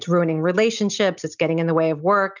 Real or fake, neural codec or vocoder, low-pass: real; none; 7.2 kHz